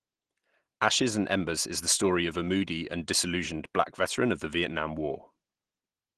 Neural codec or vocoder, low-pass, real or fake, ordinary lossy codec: none; 10.8 kHz; real; Opus, 16 kbps